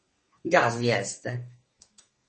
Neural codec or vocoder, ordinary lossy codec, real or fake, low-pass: codec, 32 kHz, 1.9 kbps, SNAC; MP3, 32 kbps; fake; 10.8 kHz